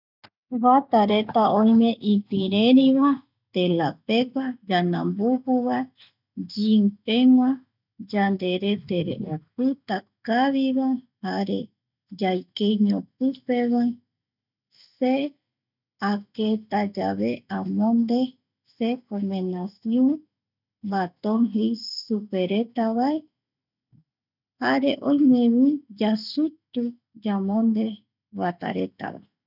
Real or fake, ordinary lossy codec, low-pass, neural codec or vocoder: real; none; 5.4 kHz; none